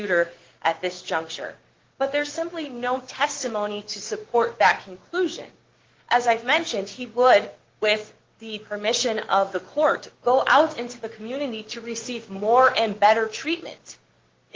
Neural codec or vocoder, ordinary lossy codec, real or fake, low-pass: codec, 16 kHz, 6 kbps, DAC; Opus, 16 kbps; fake; 7.2 kHz